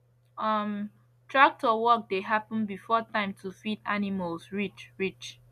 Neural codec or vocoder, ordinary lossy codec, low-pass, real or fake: none; none; 14.4 kHz; real